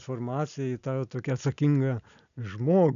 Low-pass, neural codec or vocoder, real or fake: 7.2 kHz; none; real